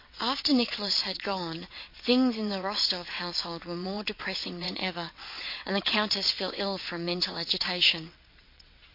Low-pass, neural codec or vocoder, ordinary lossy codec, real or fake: 5.4 kHz; vocoder, 22.05 kHz, 80 mel bands, WaveNeXt; MP3, 32 kbps; fake